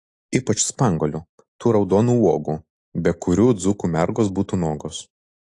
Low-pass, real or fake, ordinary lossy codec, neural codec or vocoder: 10.8 kHz; real; AAC, 48 kbps; none